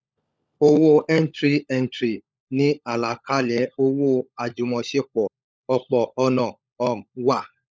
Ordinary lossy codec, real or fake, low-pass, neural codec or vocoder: none; fake; none; codec, 16 kHz, 16 kbps, FunCodec, trained on LibriTTS, 50 frames a second